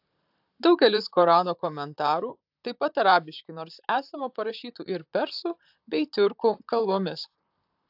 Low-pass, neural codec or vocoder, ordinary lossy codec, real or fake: 5.4 kHz; vocoder, 44.1 kHz, 128 mel bands, Pupu-Vocoder; AAC, 48 kbps; fake